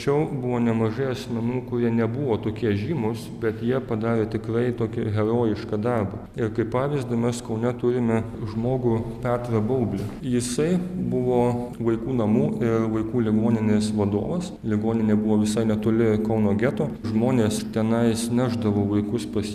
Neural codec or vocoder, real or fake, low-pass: none; real; 14.4 kHz